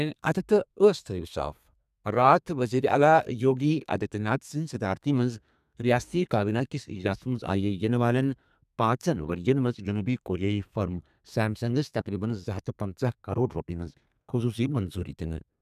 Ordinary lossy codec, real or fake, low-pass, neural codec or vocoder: none; fake; 14.4 kHz; codec, 32 kHz, 1.9 kbps, SNAC